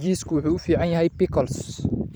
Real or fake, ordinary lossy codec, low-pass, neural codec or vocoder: fake; none; none; vocoder, 44.1 kHz, 128 mel bands every 512 samples, BigVGAN v2